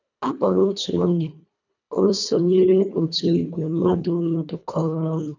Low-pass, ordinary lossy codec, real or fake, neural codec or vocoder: 7.2 kHz; none; fake; codec, 24 kHz, 1.5 kbps, HILCodec